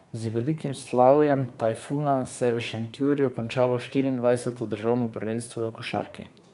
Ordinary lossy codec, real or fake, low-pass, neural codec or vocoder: none; fake; 10.8 kHz; codec, 24 kHz, 1 kbps, SNAC